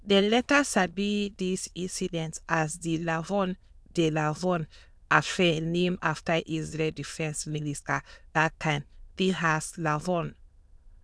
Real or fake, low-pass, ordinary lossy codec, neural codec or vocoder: fake; none; none; autoencoder, 22.05 kHz, a latent of 192 numbers a frame, VITS, trained on many speakers